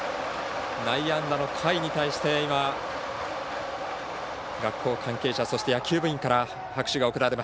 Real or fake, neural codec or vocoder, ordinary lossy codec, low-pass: real; none; none; none